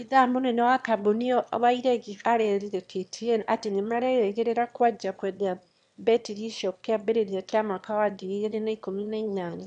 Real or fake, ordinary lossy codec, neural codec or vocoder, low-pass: fake; none; autoencoder, 22.05 kHz, a latent of 192 numbers a frame, VITS, trained on one speaker; 9.9 kHz